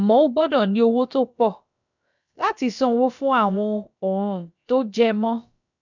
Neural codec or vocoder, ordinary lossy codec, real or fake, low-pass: codec, 16 kHz, about 1 kbps, DyCAST, with the encoder's durations; none; fake; 7.2 kHz